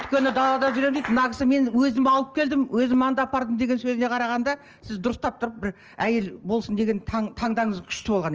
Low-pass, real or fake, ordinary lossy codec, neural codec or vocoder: 7.2 kHz; real; Opus, 24 kbps; none